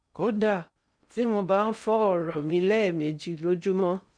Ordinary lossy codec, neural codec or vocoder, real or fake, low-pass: none; codec, 16 kHz in and 24 kHz out, 0.6 kbps, FocalCodec, streaming, 2048 codes; fake; 9.9 kHz